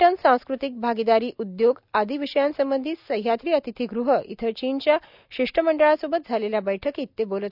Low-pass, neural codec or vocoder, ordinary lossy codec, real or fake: 5.4 kHz; none; none; real